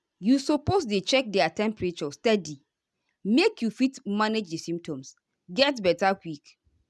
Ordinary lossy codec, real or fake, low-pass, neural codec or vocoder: none; real; none; none